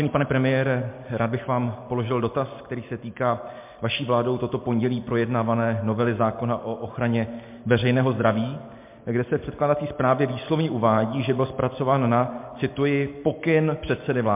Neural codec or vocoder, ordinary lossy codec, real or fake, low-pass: none; MP3, 32 kbps; real; 3.6 kHz